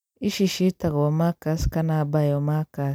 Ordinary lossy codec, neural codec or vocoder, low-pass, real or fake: none; vocoder, 44.1 kHz, 128 mel bands every 256 samples, BigVGAN v2; none; fake